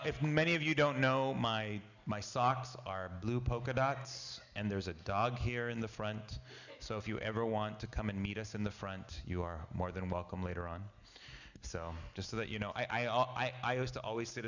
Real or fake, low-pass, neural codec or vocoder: real; 7.2 kHz; none